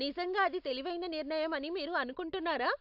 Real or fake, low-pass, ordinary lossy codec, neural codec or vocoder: real; 5.4 kHz; AAC, 48 kbps; none